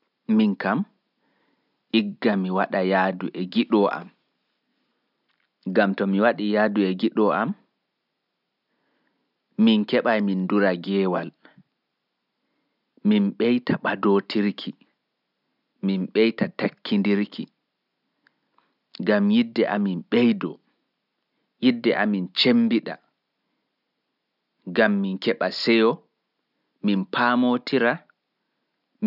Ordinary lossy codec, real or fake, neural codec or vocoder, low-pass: none; real; none; 5.4 kHz